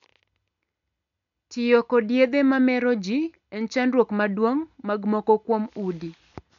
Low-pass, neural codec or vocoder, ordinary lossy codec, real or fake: 7.2 kHz; none; none; real